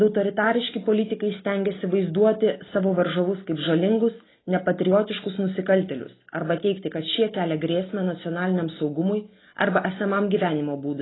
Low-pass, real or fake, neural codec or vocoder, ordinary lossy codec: 7.2 kHz; real; none; AAC, 16 kbps